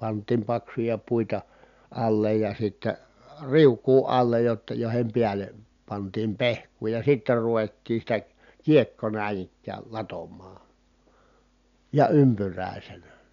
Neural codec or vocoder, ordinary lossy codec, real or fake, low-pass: none; none; real; 7.2 kHz